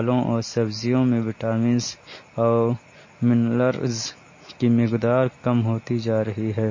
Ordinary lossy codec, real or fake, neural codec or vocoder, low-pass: MP3, 32 kbps; real; none; 7.2 kHz